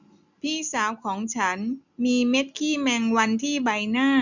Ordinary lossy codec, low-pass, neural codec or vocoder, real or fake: none; 7.2 kHz; none; real